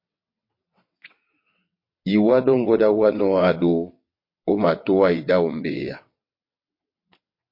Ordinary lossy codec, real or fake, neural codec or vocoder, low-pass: MP3, 32 kbps; fake; vocoder, 22.05 kHz, 80 mel bands, WaveNeXt; 5.4 kHz